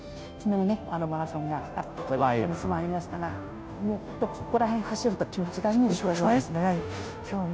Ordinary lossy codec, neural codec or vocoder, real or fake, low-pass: none; codec, 16 kHz, 0.5 kbps, FunCodec, trained on Chinese and English, 25 frames a second; fake; none